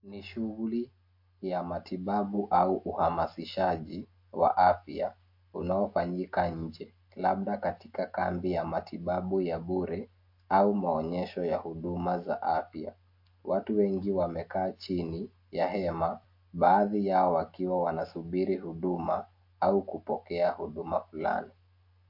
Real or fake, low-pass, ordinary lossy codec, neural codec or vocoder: real; 5.4 kHz; MP3, 32 kbps; none